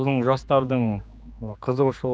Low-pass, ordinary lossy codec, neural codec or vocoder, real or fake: none; none; codec, 16 kHz, 2 kbps, X-Codec, HuBERT features, trained on general audio; fake